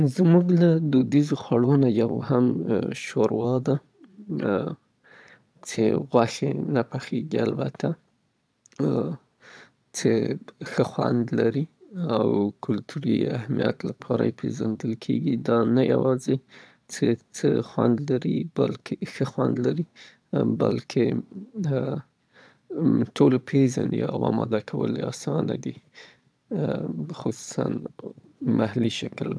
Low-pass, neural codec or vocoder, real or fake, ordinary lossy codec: none; vocoder, 22.05 kHz, 80 mel bands, Vocos; fake; none